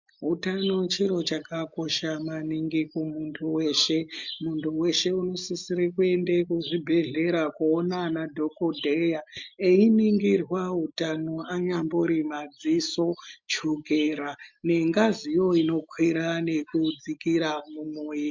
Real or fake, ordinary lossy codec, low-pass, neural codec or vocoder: real; MP3, 64 kbps; 7.2 kHz; none